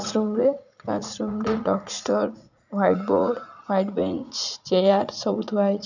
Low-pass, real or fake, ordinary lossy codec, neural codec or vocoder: 7.2 kHz; fake; none; vocoder, 44.1 kHz, 80 mel bands, Vocos